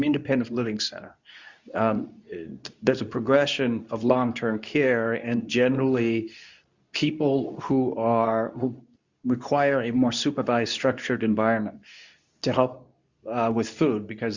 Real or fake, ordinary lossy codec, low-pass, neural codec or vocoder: fake; Opus, 64 kbps; 7.2 kHz; codec, 24 kHz, 0.9 kbps, WavTokenizer, medium speech release version 2